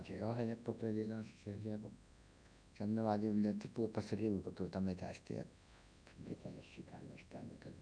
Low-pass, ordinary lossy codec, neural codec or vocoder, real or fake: 9.9 kHz; none; codec, 24 kHz, 0.9 kbps, WavTokenizer, large speech release; fake